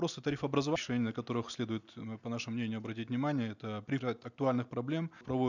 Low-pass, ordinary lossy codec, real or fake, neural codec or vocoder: 7.2 kHz; none; real; none